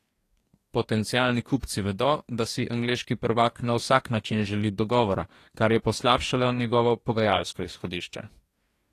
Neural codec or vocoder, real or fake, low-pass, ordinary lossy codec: codec, 44.1 kHz, 2.6 kbps, DAC; fake; 14.4 kHz; AAC, 48 kbps